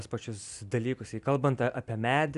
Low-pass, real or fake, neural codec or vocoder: 10.8 kHz; real; none